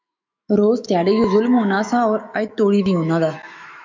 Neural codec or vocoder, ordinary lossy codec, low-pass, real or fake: autoencoder, 48 kHz, 128 numbers a frame, DAC-VAE, trained on Japanese speech; MP3, 64 kbps; 7.2 kHz; fake